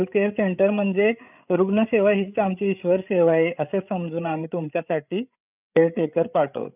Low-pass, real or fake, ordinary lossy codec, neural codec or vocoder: 3.6 kHz; fake; none; codec, 16 kHz, 8 kbps, FreqCodec, larger model